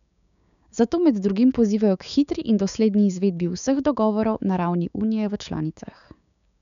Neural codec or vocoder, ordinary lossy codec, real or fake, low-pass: codec, 16 kHz, 6 kbps, DAC; none; fake; 7.2 kHz